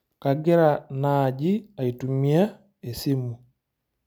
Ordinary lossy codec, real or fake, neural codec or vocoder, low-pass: none; real; none; none